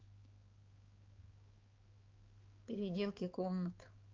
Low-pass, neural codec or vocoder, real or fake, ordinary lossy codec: 7.2 kHz; codec, 16 kHz, 4 kbps, X-Codec, HuBERT features, trained on balanced general audio; fake; Opus, 24 kbps